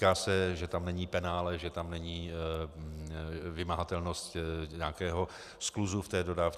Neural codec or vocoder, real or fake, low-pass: none; real; 14.4 kHz